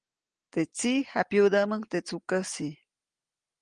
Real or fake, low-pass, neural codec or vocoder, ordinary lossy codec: real; 9.9 kHz; none; Opus, 24 kbps